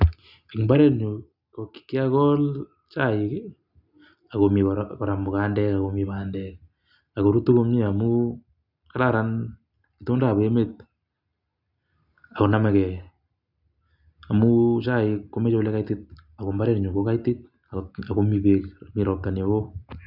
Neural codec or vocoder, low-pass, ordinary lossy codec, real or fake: none; 5.4 kHz; none; real